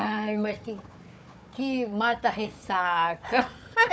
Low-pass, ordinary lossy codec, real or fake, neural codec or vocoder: none; none; fake; codec, 16 kHz, 4 kbps, FunCodec, trained on Chinese and English, 50 frames a second